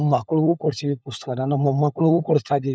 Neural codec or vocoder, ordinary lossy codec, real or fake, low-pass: codec, 16 kHz, 16 kbps, FunCodec, trained on LibriTTS, 50 frames a second; none; fake; none